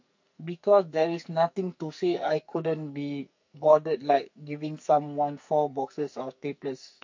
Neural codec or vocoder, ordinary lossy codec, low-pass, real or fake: codec, 44.1 kHz, 2.6 kbps, SNAC; MP3, 48 kbps; 7.2 kHz; fake